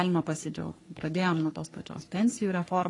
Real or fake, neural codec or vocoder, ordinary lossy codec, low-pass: fake; codec, 44.1 kHz, 3.4 kbps, Pupu-Codec; AAC, 32 kbps; 10.8 kHz